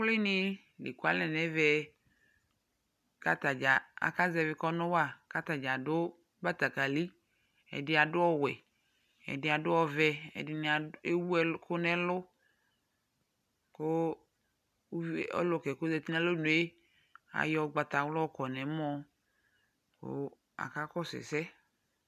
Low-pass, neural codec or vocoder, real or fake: 14.4 kHz; none; real